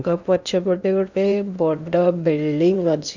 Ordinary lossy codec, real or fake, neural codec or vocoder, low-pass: none; fake; codec, 16 kHz in and 24 kHz out, 0.8 kbps, FocalCodec, streaming, 65536 codes; 7.2 kHz